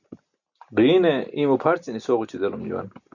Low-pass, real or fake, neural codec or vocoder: 7.2 kHz; real; none